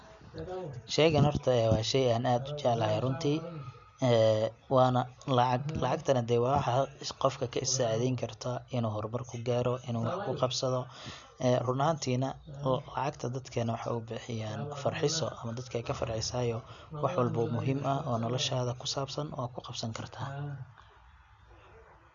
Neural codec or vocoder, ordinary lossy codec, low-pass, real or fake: none; none; 7.2 kHz; real